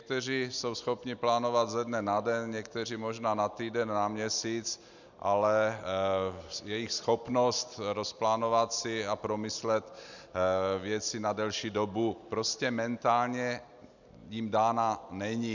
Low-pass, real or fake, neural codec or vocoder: 7.2 kHz; real; none